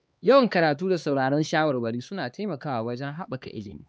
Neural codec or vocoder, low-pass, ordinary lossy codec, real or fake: codec, 16 kHz, 2 kbps, X-Codec, HuBERT features, trained on LibriSpeech; none; none; fake